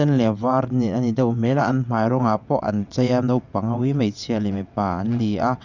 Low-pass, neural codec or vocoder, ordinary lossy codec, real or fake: 7.2 kHz; vocoder, 22.05 kHz, 80 mel bands, WaveNeXt; none; fake